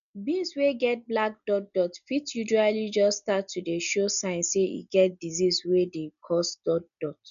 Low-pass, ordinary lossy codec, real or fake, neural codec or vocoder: 7.2 kHz; none; real; none